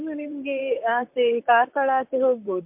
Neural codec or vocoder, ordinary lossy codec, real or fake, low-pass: vocoder, 44.1 kHz, 128 mel bands, Pupu-Vocoder; MP3, 32 kbps; fake; 3.6 kHz